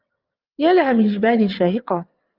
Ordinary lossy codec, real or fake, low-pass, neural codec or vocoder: Opus, 24 kbps; fake; 5.4 kHz; vocoder, 22.05 kHz, 80 mel bands, WaveNeXt